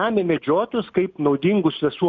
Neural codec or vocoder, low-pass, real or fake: none; 7.2 kHz; real